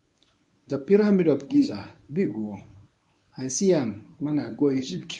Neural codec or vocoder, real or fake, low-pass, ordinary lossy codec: codec, 24 kHz, 0.9 kbps, WavTokenizer, medium speech release version 1; fake; 10.8 kHz; none